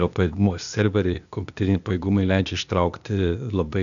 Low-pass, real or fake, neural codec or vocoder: 7.2 kHz; fake; codec, 16 kHz, 0.8 kbps, ZipCodec